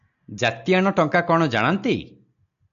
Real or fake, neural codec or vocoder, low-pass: real; none; 7.2 kHz